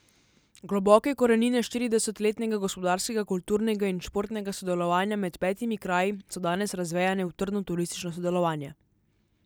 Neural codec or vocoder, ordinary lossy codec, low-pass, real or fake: none; none; none; real